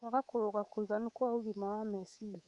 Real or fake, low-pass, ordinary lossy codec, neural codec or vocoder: fake; 10.8 kHz; none; codec, 24 kHz, 3.1 kbps, DualCodec